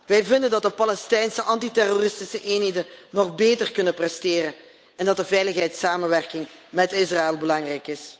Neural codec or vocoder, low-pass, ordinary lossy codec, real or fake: codec, 16 kHz, 8 kbps, FunCodec, trained on Chinese and English, 25 frames a second; none; none; fake